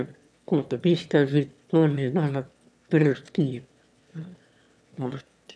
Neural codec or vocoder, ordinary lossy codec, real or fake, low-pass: autoencoder, 22.05 kHz, a latent of 192 numbers a frame, VITS, trained on one speaker; none; fake; none